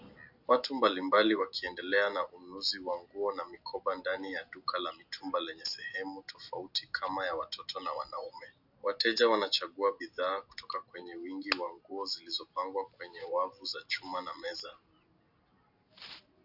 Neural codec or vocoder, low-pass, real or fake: none; 5.4 kHz; real